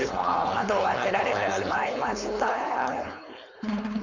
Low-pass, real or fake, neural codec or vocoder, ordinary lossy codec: 7.2 kHz; fake; codec, 16 kHz, 4.8 kbps, FACodec; MP3, 48 kbps